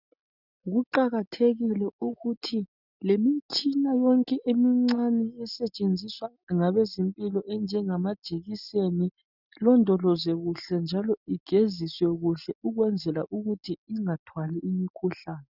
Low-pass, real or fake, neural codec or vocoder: 5.4 kHz; real; none